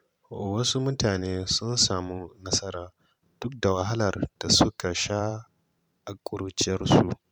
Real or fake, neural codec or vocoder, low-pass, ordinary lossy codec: fake; vocoder, 44.1 kHz, 128 mel bands every 512 samples, BigVGAN v2; 19.8 kHz; none